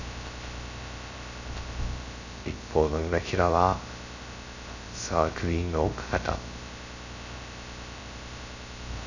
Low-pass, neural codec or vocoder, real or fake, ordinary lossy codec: 7.2 kHz; codec, 16 kHz, 0.2 kbps, FocalCodec; fake; none